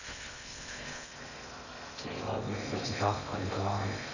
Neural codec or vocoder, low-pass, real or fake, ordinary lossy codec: codec, 16 kHz in and 24 kHz out, 0.6 kbps, FocalCodec, streaming, 2048 codes; 7.2 kHz; fake; none